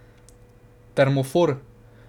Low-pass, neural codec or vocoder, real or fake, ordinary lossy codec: 19.8 kHz; none; real; none